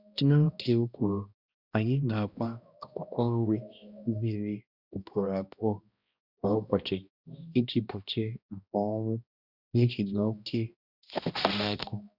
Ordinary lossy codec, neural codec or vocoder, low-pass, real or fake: Opus, 64 kbps; codec, 16 kHz, 1 kbps, X-Codec, HuBERT features, trained on balanced general audio; 5.4 kHz; fake